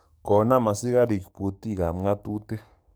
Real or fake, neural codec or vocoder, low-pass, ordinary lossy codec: fake; codec, 44.1 kHz, 7.8 kbps, DAC; none; none